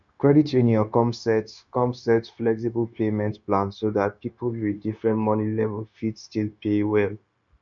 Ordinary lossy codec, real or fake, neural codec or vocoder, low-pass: none; fake; codec, 16 kHz, 0.9 kbps, LongCat-Audio-Codec; 7.2 kHz